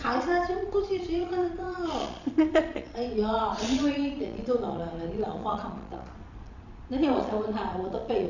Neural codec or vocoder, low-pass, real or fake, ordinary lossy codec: vocoder, 22.05 kHz, 80 mel bands, Vocos; 7.2 kHz; fake; none